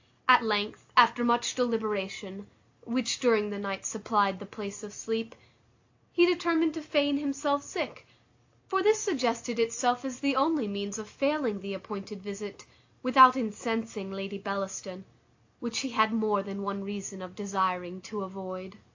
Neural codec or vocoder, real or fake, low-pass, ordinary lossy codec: none; real; 7.2 kHz; AAC, 48 kbps